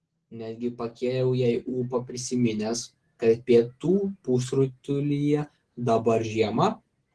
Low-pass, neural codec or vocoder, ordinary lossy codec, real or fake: 10.8 kHz; none; Opus, 16 kbps; real